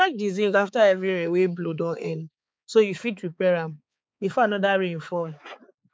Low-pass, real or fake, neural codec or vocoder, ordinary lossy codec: none; fake; codec, 16 kHz, 4 kbps, X-Codec, HuBERT features, trained on balanced general audio; none